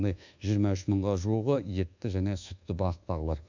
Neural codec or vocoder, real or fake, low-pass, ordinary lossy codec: codec, 24 kHz, 1.2 kbps, DualCodec; fake; 7.2 kHz; none